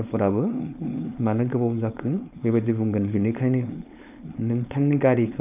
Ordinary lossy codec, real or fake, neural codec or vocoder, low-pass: AAC, 32 kbps; fake; codec, 16 kHz, 4.8 kbps, FACodec; 3.6 kHz